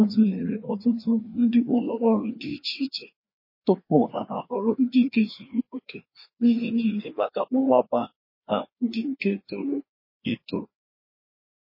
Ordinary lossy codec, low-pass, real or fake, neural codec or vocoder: MP3, 24 kbps; 5.4 kHz; fake; codec, 16 kHz, 2 kbps, FreqCodec, larger model